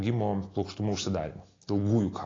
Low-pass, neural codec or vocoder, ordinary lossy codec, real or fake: 7.2 kHz; none; AAC, 32 kbps; real